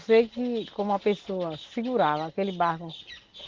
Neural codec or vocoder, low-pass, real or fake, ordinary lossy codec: none; 7.2 kHz; real; Opus, 16 kbps